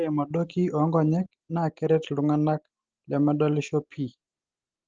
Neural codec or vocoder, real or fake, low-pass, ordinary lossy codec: none; real; 7.2 kHz; Opus, 16 kbps